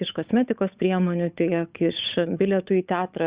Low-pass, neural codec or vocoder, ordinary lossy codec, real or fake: 3.6 kHz; codec, 16 kHz, 8 kbps, FunCodec, trained on Chinese and English, 25 frames a second; Opus, 64 kbps; fake